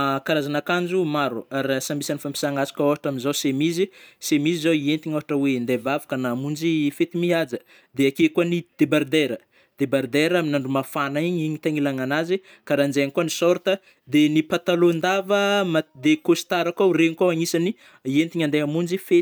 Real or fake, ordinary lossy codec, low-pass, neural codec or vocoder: real; none; none; none